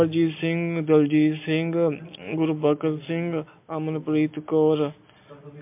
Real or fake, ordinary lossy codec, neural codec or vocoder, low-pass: real; MP3, 32 kbps; none; 3.6 kHz